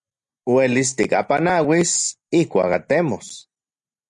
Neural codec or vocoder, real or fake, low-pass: none; real; 10.8 kHz